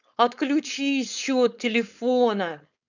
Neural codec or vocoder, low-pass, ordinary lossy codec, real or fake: codec, 16 kHz, 4.8 kbps, FACodec; 7.2 kHz; none; fake